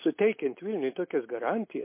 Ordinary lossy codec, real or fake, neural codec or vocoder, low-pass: MP3, 24 kbps; real; none; 3.6 kHz